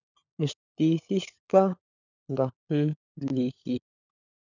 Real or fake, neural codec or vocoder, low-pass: fake; codec, 16 kHz, 16 kbps, FunCodec, trained on LibriTTS, 50 frames a second; 7.2 kHz